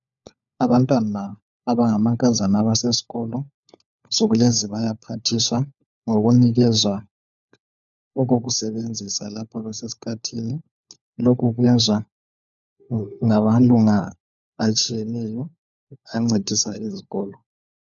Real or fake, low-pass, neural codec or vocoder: fake; 7.2 kHz; codec, 16 kHz, 4 kbps, FunCodec, trained on LibriTTS, 50 frames a second